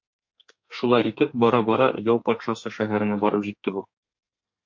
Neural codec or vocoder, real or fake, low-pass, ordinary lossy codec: codec, 32 kHz, 1.9 kbps, SNAC; fake; 7.2 kHz; MP3, 48 kbps